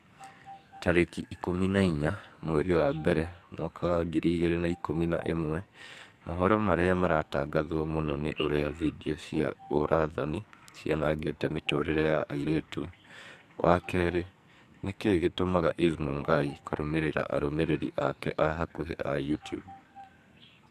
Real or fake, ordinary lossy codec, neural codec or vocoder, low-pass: fake; MP3, 96 kbps; codec, 44.1 kHz, 2.6 kbps, SNAC; 14.4 kHz